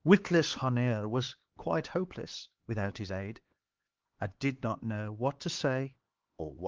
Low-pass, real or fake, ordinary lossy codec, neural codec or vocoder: 7.2 kHz; fake; Opus, 16 kbps; codec, 16 kHz, 4 kbps, X-Codec, HuBERT features, trained on LibriSpeech